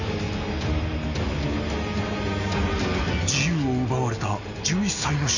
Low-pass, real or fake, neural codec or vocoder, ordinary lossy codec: 7.2 kHz; real; none; none